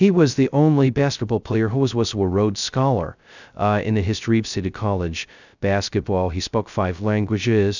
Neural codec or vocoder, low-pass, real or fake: codec, 16 kHz, 0.2 kbps, FocalCodec; 7.2 kHz; fake